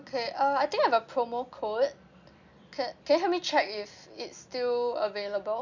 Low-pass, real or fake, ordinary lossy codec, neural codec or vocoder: 7.2 kHz; real; none; none